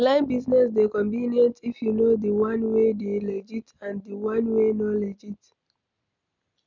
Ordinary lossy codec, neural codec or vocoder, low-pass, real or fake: none; none; 7.2 kHz; real